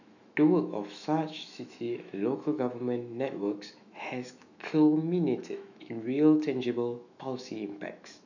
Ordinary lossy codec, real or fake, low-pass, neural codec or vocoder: none; fake; 7.2 kHz; autoencoder, 48 kHz, 128 numbers a frame, DAC-VAE, trained on Japanese speech